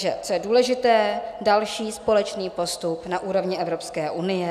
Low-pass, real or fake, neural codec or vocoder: 14.4 kHz; real; none